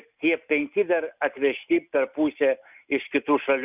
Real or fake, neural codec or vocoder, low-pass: real; none; 3.6 kHz